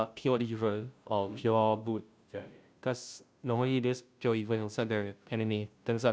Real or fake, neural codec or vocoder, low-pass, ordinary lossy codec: fake; codec, 16 kHz, 0.5 kbps, FunCodec, trained on Chinese and English, 25 frames a second; none; none